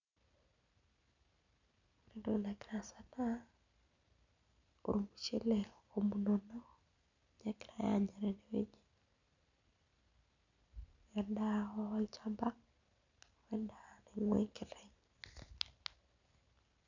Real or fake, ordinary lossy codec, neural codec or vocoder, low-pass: real; none; none; 7.2 kHz